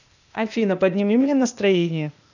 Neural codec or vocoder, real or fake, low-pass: codec, 16 kHz, 0.8 kbps, ZipCodec; fake; 7.2 kHz